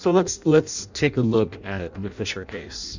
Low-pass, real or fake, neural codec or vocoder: 7.2 kHz; fake; codec, 16 kHz in and 24 kHz out, 0.6 kbps, FireRedTTS-2 codec